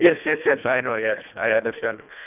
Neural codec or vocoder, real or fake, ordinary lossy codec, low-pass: codec, 24 kHz, 1.5 kbps, HILCodec; fake; none; 3.6 kHz